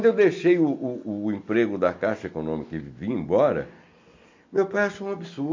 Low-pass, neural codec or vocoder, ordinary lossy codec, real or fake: 7.2 kHz; none; MP3, 48 kbps; real